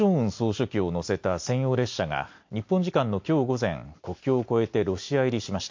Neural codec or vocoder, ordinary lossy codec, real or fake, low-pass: none; MP3, 48 kbps; real; 7.2 kHz